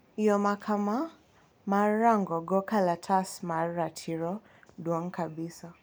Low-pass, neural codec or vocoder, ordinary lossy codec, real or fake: none; none; none; real